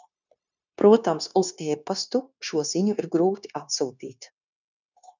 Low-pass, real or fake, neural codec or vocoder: 7.2 kHz; fake; codec, 16 kHz, 0.9 kbps, LongCat-Audio-Codec